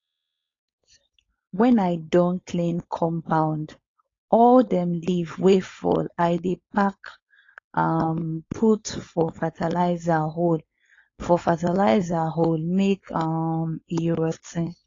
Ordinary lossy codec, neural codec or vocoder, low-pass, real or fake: AAC, 32 kbps; codec, 16 kHz, 4.8 kbps, FACodec; 7.2 kHz; fake